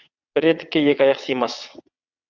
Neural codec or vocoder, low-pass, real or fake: vocoder, 22.05 kHz, 80 mel bands, WaveNeXt; 7.2 kHz; fake